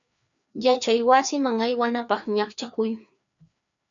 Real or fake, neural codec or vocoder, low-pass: fake; codec, 16 kHz, 2 kbps, FreqCodec, larger model; 7.2 kHz